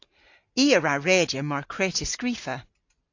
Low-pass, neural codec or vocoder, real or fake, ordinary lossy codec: 7.2 kHz; none; real; AAC, 48 kbps